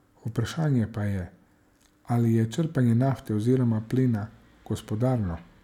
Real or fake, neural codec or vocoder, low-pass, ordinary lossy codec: real; none; 19.8 kHz; none